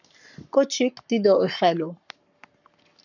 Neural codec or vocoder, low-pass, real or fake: codec, 44.1 kHz, 7.8 kbps, Pupu-Codec; 7.2 kHz; fake